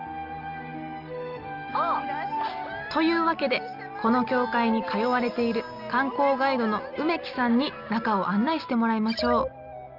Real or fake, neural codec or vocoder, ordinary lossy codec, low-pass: real; none; Opus, 24 kbps; 5.4 kHz